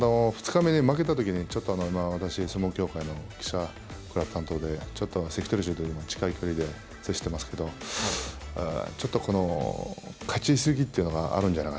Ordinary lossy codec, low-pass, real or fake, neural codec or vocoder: none; none; real; none